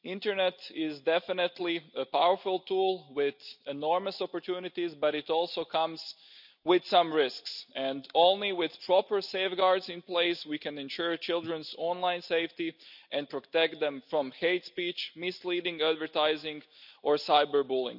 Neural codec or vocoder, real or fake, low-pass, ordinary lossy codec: none; real; 5.4 kHz; none